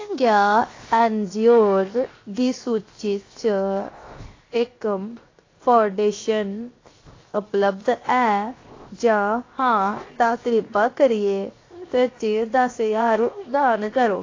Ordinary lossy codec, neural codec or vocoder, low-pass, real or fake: AAC, 32 kbps; codec, 16 kHz, 0.7 kbps, FocalCodec; 7.2 kHz; fake